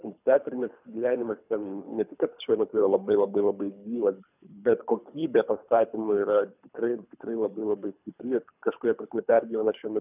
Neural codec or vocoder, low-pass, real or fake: codec, 24 kHz, 3 kbps, HILCodec; 3.6 kHz; fake